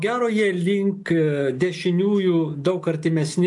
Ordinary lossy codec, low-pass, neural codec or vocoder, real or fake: AAC, 64 kbps; 10.8 kHz; none; real